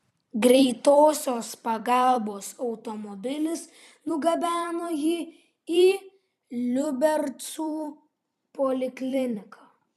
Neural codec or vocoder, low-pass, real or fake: vocoder, 44.1 kHz, 128 mel bands every 256 samples, BigVGAN v2; 14.4 kHz; fake